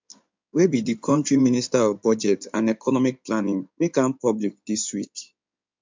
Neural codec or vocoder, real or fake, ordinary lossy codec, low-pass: codec, 16 kHz in and 24 kHz out, 2.2 kbps, FireRedTTS-2 codec; fake; MP3, 64 kbps; 7.2 kHz